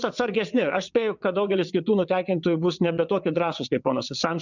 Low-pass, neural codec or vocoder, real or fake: 7.2 kHz; vocoder, 22.05 kHz, 80 mel bands, WaveNeXt; fake